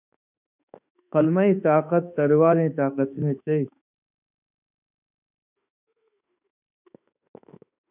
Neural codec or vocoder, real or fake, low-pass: autoencoder, 48 kHz, 32 numbers a frame, DAC-VAE, trained on Japanese speech; fake; 3.6 kHz